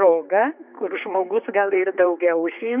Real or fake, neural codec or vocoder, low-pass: fake; codec, 16 kHz, 4 kbps, X-Codec, HuBERT features, trained on general audio; 3.6 kHz